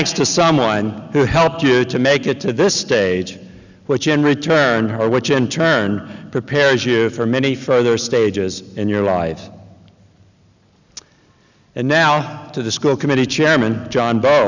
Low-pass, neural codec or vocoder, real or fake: 7.2 kHz; none; real